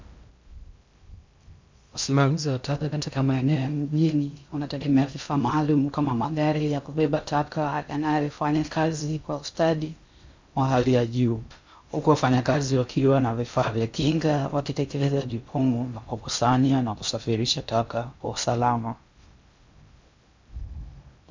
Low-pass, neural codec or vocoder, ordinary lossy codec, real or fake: 7.2 kHz; codec, 16 kHz in and 24 kHz out, 0.6 kbps, FocalCodec, streaming, 2048 codes; MP3, 64 kbps; fake